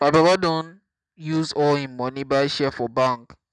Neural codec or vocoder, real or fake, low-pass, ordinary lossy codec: none; real; 9.9 kHz; none